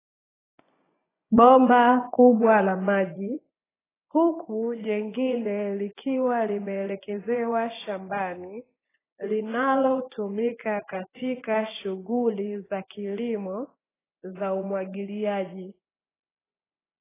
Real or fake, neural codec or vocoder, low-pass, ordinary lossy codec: fake; vocoder, 24 kHz, 100 mel bands, Vocos; 3.6 kHz; AAC, 16 kbps